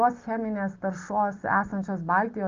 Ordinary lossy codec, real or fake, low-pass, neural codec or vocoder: Opus, 24 kbps; real; 7.2 kHz; none